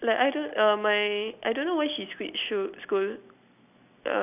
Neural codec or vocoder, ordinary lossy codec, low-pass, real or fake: none; none; 3.6 kHz; real